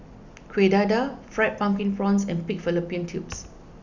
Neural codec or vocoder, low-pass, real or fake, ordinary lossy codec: none; 7.2 kHz; real; none